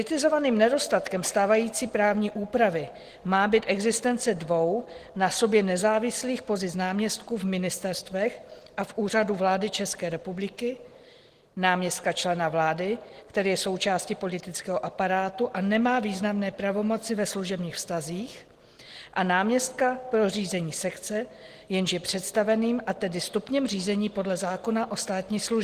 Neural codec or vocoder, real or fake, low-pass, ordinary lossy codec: none; real; 14.4 kHz; Opus, 16 kbps